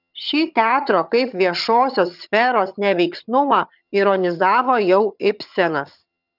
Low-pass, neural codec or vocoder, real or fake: 5.4 kHz; vocoder, 22.05 kHz, 80 mel bands, HiFi-GAN; fake